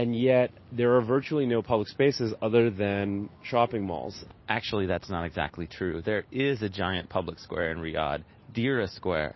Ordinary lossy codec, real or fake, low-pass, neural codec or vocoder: MP3, 24 kbps; real; 7.2 kHz; none